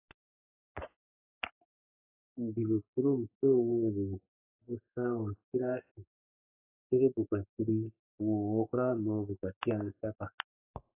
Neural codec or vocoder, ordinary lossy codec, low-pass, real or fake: codec, 44.1 kHz, 3.4 kbps, Pupu-Codec; AAC, 24 kbps; 3.6 kHz; fake